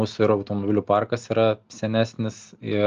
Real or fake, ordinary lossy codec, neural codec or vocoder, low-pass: real; Opus, 32 kbps; none; 7.2 kHz